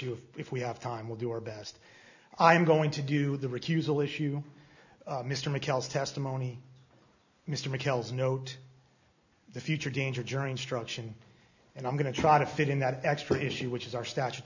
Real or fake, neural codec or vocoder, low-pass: real; none; 7.2 kHz